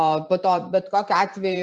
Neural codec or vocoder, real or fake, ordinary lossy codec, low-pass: vocoder, 44.1 kHz, 128 mel bands every 512 samples, BigVGAN v2; fake; Opus, 64 kbps; 10.8 kHz